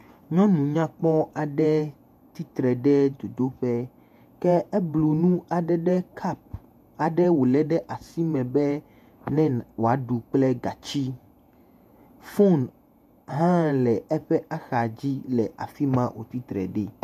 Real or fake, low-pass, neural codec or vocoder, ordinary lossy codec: fake; 14.4 kHz; vocoder, 48 kHz, 128 mel bands, Vocos; MP3, 96 kbps